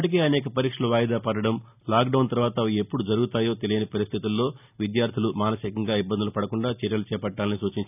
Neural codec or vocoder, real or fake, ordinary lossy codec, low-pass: none; real; none; 3.6 kHz